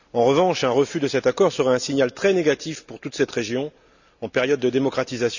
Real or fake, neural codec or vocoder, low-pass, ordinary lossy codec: real; none; 7.2 kHz; none